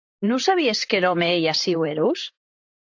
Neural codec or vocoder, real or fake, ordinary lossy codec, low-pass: vocoder, 44.1 kHz, 128 mel bands, Pupu-Vocoder; fake; AAC, 48 kbps; 7.2 kHz